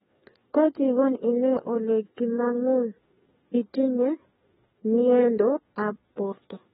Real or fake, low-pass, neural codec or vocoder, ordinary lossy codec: fake; 7.2 kHz; codec, 16 kHz, 2 kbps, FreqCodec, larger model; AAC, 16 kbps